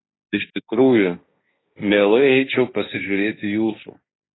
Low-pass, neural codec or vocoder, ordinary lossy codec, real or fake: 7.2 kHz; codec, 16 kHz, 1.1 kbps, Voila-Tokenizer; AAC, 16 kbps; fake